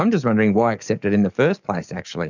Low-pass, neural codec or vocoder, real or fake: 7.2 kHz; codec, 44.1 kHz, 7.8 kbps, DAC; fake